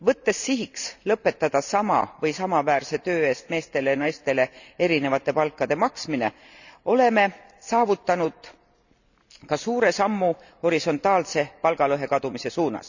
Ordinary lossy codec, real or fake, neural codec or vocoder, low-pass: none; real; none; 7.2 kHz